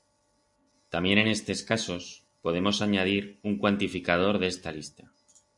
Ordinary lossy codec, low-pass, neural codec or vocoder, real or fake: MP3, 96 kbps; 10.8 kHz; none; real